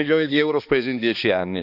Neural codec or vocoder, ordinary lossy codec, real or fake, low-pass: codec, 16 kHz, 2 kbps, X-Codec, HuBERT features, trained on balanced general audio; none; fake; 5.4 kHz